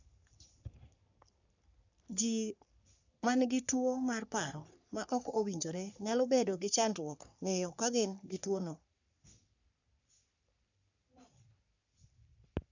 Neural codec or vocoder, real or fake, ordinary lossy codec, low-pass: codec, 44.1 kHz, 3.4 kbps, Pupu-Codec; fake; none; 7.2 kHz